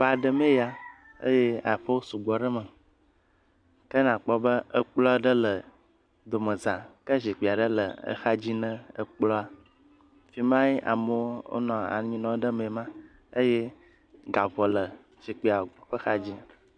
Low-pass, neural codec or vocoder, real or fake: 9.9 kHz; none; real